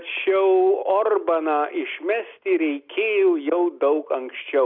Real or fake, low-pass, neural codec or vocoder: real; 5.4 kHz; none